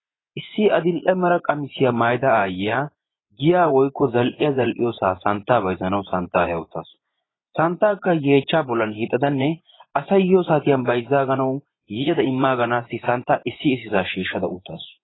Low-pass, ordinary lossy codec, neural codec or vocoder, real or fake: 7.2 kHz; AAC, 16 kbps; vocoder, 24 kHz, 100 mel bands, Vocos; fake